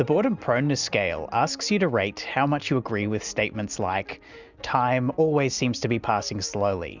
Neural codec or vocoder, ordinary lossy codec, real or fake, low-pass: none; Opus, 64 kbps; real; 7.2 kHz